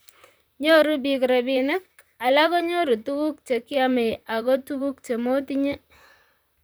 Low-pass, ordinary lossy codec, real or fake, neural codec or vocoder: none; none; fake; vocoder, 44.1 kHz, 128 mel bands, Pupu-Vocoder